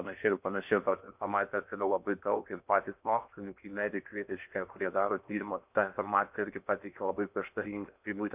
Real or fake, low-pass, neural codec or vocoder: fake; 3.6 kHz; codec, 16 kHz in and 24 kHz out, 0.6 kbps, FocalCodec, streaming, 4096 codes